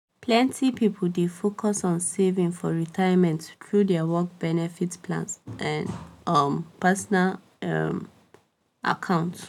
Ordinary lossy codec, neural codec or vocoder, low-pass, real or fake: none; none; 19.8 kHz; real